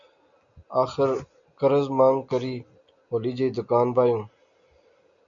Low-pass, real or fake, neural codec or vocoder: 7.2 kHz; real; none